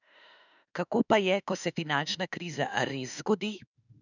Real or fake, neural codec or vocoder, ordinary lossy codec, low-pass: fake; autoencoder, 48 kHz, 32 numbers a frame, DAC-VAE, trained on Japanese speech; none; 7.2 kHz